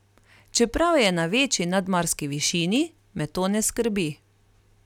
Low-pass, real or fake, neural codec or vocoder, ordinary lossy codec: 19.8 kHz; real; none; none